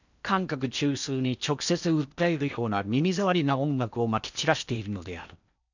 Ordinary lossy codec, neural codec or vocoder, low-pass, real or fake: none; codec, 16 kHz in and 24 kHz out, 0.8 kbps, FocalCodec, streaming, 65536 codes; 7.2 kHz; fake